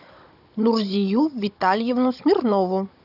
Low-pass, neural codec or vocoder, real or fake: 5.4 kHz; codec, 16 kHz, 16 kbps, FunCodec, trained on Chinese and English, 50 frames a second; fake